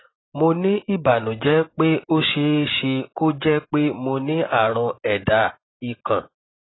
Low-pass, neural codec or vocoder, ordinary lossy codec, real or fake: 7.2 kHz; none; AAC, 16 kbps; real